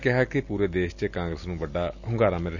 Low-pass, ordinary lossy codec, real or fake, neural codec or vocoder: 7.2 kHz; none; real; none